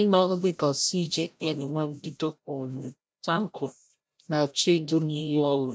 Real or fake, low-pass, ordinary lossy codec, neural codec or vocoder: fake; none; none; codec, 16 kHz, 0.5 kbps, FreqCodec, larger model